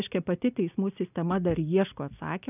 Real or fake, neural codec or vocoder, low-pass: real; none; 3.6 kHz